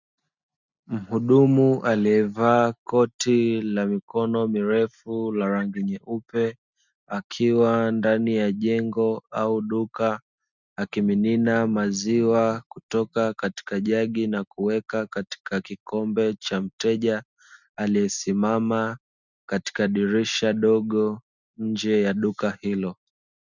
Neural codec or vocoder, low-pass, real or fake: none; 7.2 kHz; real